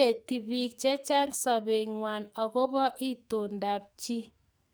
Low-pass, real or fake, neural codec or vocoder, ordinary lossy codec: none; fake; codec, 44.1 kHz, 2.6 kbps, SNAC; none